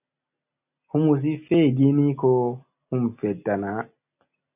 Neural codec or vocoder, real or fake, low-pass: none; real; 3.6 kHz